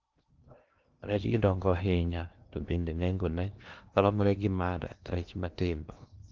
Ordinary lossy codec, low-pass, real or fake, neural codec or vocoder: Opus, 24 kbps; 7.2 kHz; fake; codec, 16 kHz in and 24 kHz out, 0.8 kbps, FocalCodec, streaming, 65536 codes